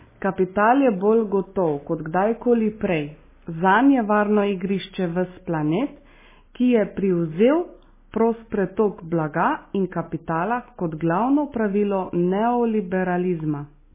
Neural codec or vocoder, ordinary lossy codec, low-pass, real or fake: none; MP3, 16 kbps; 3.6 kHz; real